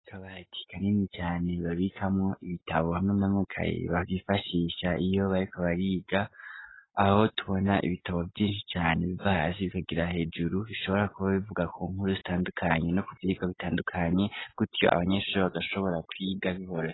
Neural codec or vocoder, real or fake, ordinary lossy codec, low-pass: none; real; AAC, 16 kbps; 7.2 kHz